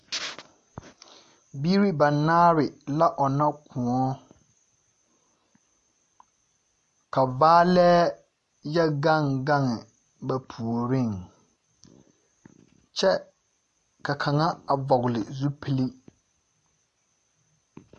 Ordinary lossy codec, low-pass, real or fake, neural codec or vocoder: MP3, 48 kbps; 10.8 kHz; real; none